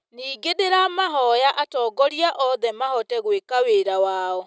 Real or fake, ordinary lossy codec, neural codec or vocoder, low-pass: real; none; none; none